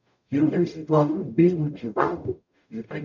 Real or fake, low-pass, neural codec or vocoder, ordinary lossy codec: fake; 7.2 kHz; codec, 44.1 kHz, 0.9 kbps, DAC; none